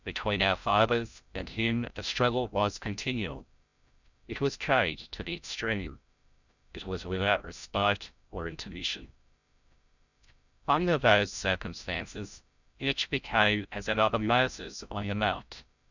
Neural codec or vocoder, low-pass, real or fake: codec, 16 kHz, 0.5 kbps, FreqCodec, larger model; 7.2 kHz; fake